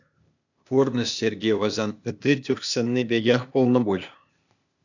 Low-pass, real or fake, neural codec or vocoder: 7.2 kHz; fake; codec, 16 kHz, 0.8 kbps, ZipCodec